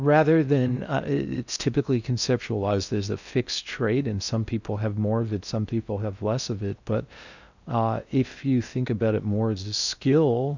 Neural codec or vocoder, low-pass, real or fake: codec, 16 kHz in and 24 kHz out, 0.6 kbps, FocalCodec, streaming, 4096 codes; 7.2 kHz; fake